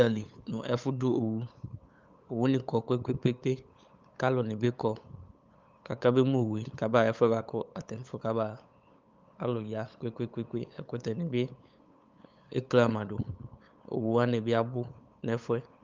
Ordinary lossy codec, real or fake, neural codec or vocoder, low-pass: Opus, 24 kbps; fake; codec, 16 kHz, 8 kbps, FunCodec, trained on LibriTTS, 25 frames a second; 7.2 kHz